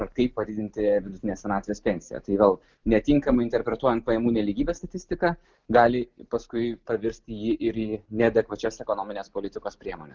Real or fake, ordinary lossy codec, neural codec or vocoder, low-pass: real; Opus, 32 kbps; none; 7.2 kHz